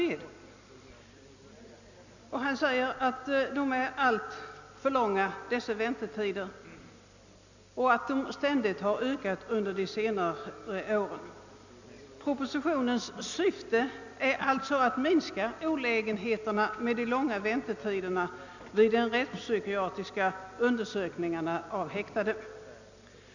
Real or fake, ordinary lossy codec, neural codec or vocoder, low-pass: real; none; none; 7.2 kHz